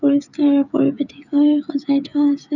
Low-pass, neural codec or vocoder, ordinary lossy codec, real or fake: 7.2 kHz; codec, 16 kHz, 16 kbps, FreqCodec, smaller model; none; fake